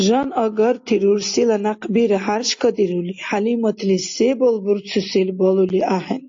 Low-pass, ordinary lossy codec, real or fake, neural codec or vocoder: 7.2 kHz; AAC, 48 kbps; real; none